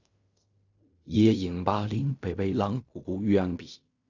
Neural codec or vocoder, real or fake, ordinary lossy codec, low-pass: codec, 16 kHz in and 24 kHz out, 0.4 kbps, LongCat-Audio-Codec, fine tuned four codebook decoder; fake; Opus, 64 kbps; 7.2 kHz